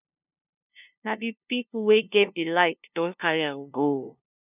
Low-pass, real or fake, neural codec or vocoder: 3.6 kHz; fake; codec, 16 kHz, 0.5 kbps, FunCodec, trained on LibriTTS, 25 frames a second